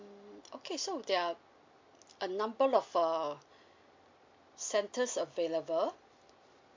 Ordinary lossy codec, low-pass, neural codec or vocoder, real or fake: MP3, 48 kbps; 7.2 kHz; none; real